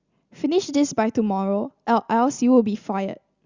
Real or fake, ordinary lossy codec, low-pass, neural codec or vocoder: real; Opus, 64 kbps; 7.2 kHz; none